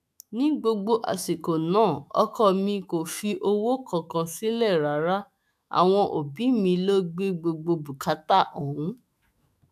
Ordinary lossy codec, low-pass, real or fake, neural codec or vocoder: none; 14.4 kHz; fake; autoencoder, 48 kHz, 128 numbers a frame, DAC-VAE, trained on Japanese speech